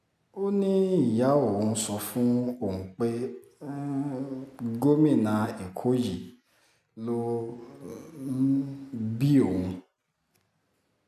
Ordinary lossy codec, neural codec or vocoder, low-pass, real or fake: AAC, 96 kbps; none; 14.4 kHz; real